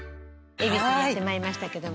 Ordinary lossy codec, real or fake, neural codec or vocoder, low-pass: none; real; none; none